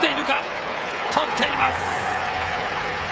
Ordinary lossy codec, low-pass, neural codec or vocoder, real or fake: none; none; codec, 16 kHz, 8 kbps, FreqCodec, smaller model; fake